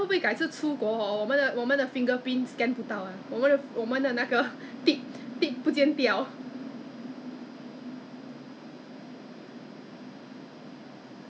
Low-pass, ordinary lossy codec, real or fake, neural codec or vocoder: none; none; real; none